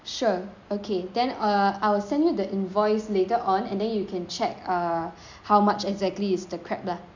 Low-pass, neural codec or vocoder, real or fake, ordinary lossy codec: 7.2 kHz; none; real; MP3, 64 kbps